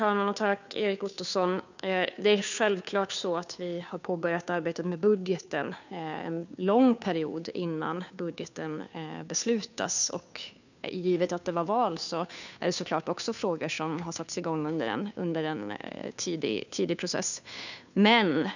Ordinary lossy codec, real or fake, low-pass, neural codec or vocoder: none; fake; 7.2 kHz; codec, 16 kHz, 2 kbps, FunCodec, trained on Chinese and English, 25 frames a second